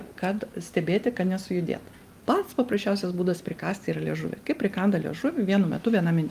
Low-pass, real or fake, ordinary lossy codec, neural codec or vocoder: 14.4 kHz; real; Opus, 32 kbps; none